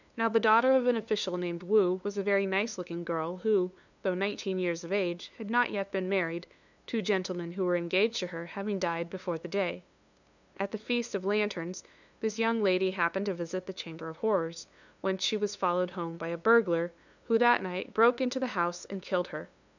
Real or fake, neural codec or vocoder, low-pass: fake; codec, 16 kHz, 2 kbps, FunCodec, trained on LibriTTS, 25 frames a second; 7.2 kHz